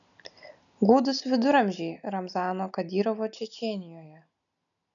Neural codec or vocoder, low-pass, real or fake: none; 7.2 kHz; real